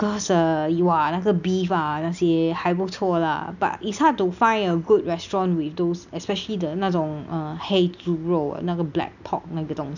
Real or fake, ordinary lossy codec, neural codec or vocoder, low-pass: real; none; none; 7.2 kHz